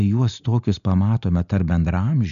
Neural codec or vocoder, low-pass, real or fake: none; 7.2 kHz; real